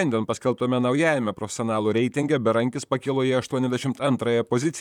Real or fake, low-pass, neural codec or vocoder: fake; 19.8 kHz; vocoder, 44.1 kHz, 128 mel bands, Pupu-Vocoder